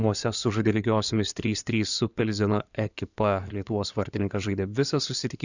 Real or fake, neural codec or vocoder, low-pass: fake; codec, 16 kHz in and 24 kHz out, 2.2 kbps, FireRedTTS-2 codec; 7.2 kHz